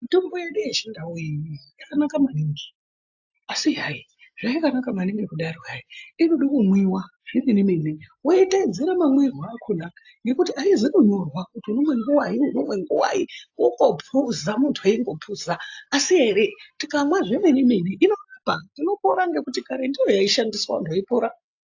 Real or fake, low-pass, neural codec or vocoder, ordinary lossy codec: real; 7.2 kHz; none; AAC, 48 kbps